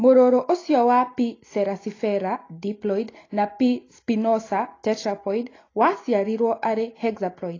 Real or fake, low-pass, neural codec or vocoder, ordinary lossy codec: real; 7.2 kHz; none; AAC, 32 kbps